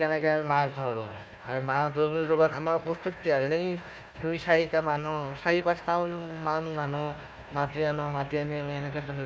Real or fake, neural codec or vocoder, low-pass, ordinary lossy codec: fake; codec, 16 kHz, 1 kbps, FunCodec, trained on Chinese and English, 50 frames a second; none; none